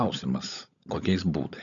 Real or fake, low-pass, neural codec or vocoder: fake; 7.2 kHz; codec, 16 kHz, 16 kbps, FunCodec, trained on LibriTTS, 50 frames a second